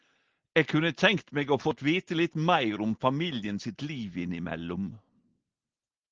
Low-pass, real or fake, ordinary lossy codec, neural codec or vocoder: 7.2 kHz; real; Opus, 16 kbps; none